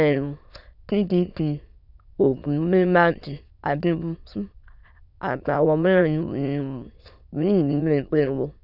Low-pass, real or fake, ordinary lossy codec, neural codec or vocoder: 5.4 kHz; fake; none; autoencoder, 22.05 kHz, a latent of 192 numbers a frame, VITS, trained on many speakers